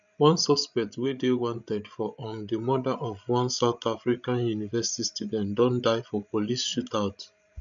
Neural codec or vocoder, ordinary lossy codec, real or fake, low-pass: codec, 16 kHz, 16 kbps, FreqCodec, larger model; none; fake; 7.2 kHz